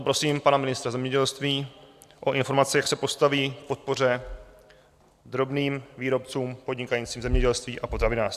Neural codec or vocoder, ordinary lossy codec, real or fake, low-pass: none; AAC, 96 kbps; real; 14.4 kHz